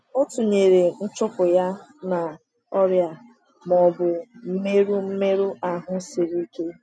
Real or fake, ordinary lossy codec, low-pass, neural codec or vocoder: real; none; none; none